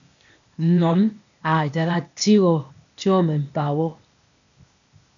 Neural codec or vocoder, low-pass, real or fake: codec, 16 kHz, 0.8 kbps, ZipCodec; 7.2 kHz; fake